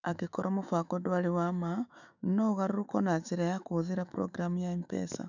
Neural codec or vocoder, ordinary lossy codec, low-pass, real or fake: autoencoder, 48 kHz, 128 numbers a frame, DAC-VAE, trained on Japanese speech; none; 7.2 kHz; fake